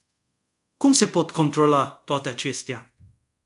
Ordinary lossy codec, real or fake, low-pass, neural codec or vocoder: AAC, 96 kbps; fake; 10.8 kHz; codec, 24 kHz, 0.5 kbps, DualCodec